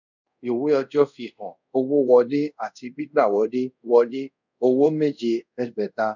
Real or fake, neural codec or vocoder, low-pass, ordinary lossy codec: fake; codec, 24 kHz, 0.5 kbps, DualCodec; 7.2 kHz; none